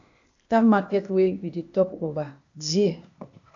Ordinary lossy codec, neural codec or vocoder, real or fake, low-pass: MP3, 64 kbps; codec, 16 kHz, 0.8 kbps, ZipCodec; fake; 7.2 kHz